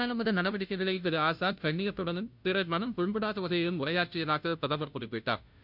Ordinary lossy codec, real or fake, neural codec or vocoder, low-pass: none; fake; codec, 16 kHz, 0.5 kbps, FunCodec, trained on Chinese and English, 25 frames a second; 5.4 kHz